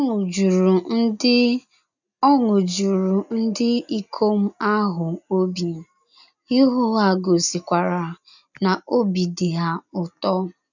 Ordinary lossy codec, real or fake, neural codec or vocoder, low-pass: none; real; none; 7.2 kHz